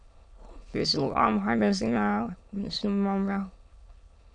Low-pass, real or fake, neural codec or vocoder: 9.9 kHz; fake; autoencoder, 22.05 kHz, a latent of 192 numbers a frame, VITS, trained on many speakers